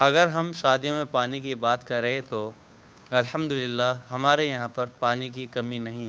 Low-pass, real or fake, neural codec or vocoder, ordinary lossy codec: none; fake; codec, 16 kHz, 2 kbps, FunCodec, trained on Chinese and English, 25 frames a second; none